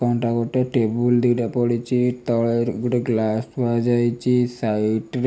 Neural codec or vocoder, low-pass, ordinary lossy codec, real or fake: none; none; none; real